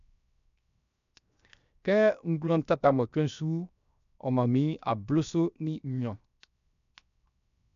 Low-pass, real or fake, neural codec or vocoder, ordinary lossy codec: 7.2 kHz; fake; codec, 16 kHz, 0.7 kbps, FocalCodec; none